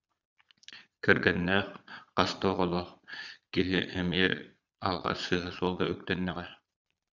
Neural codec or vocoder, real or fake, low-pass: vocoder, 22.05 kHz, 80 mel bands, Vocos; fake; 7.2 kHz